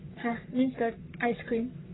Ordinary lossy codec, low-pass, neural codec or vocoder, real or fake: AAC, 16 kbps; 7.2 kHz; codec, 44.1 kHz, 3.4 kbps, Pupu-Codec; fake